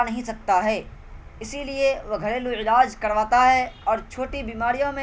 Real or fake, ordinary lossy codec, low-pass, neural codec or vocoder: real; none; none; none